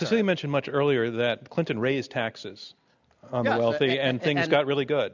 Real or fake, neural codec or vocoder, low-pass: real; none; 7.2 kHz